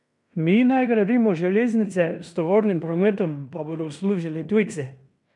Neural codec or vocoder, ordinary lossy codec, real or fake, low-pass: codec, 16 kHz in and 24 kHz out, 0.9 kbps, LongCat-Audio-Codec, fine tuned four codebook decoder; none; fake; 10.8 kHz